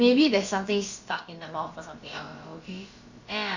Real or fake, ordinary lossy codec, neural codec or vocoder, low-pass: fake; Opus, 64 kbps; codec, 16 kHz, about 1 kbps, DyCAST, with the encoder's durations; 7.2 kHz